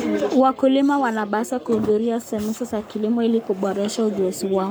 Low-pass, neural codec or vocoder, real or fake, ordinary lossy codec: none; codec, 44.1 kHz, 7.8 kbps, Pupu-Codec; fake; none